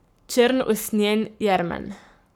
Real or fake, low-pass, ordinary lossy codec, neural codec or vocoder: fake; none; none; codec, 44.1 kHz, 7.8 kbps, Pupu-Codec